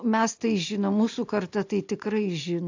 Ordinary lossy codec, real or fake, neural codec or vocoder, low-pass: AAC, 48 kbps; real; none; 7.2 kHz